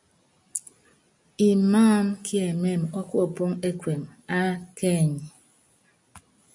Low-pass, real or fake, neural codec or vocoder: 10.8 kHz; real; none